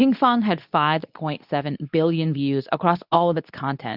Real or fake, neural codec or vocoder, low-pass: fake; codec, 24 kHz, 0.9 kbps, WavTokenizer, medium speech release version 2; 5.4 kHz